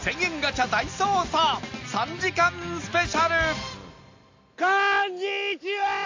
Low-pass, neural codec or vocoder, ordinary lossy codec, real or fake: 7.2 kHz; none; AAC, 48 kbps; real